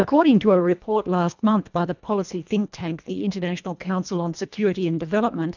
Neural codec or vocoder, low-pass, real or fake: codec, 24 kHz, 1.5 kbps, HILCodec; 7.2 kHz; fake